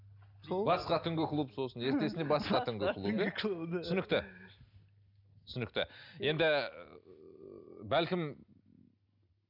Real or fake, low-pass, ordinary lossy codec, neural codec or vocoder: real; 5.4 kHz; none; none